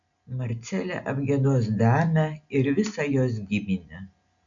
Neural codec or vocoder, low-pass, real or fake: none; 7.2 kHz; real